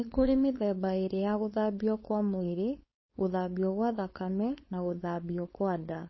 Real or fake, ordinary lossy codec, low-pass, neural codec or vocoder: fake; MP3, 24 kbps; 7.2 kHz; codec, 16 kHz, 4.8 kbps, FACodec